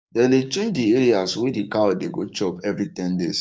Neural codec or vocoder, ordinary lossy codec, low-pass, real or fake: codec, 16 kHz, 6 kbps, DAC; none; none; fake